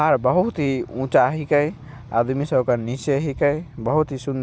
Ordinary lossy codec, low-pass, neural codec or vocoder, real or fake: none; none; none; real